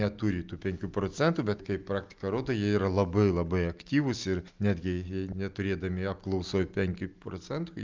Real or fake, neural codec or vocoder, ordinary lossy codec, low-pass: real; none; Opus, 24 kbps; 7.2 kHz